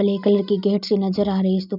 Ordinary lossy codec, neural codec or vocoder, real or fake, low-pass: none; none; real; 5.4 kHz